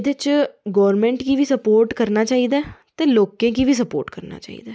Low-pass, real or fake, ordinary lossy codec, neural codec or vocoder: none; real; none; none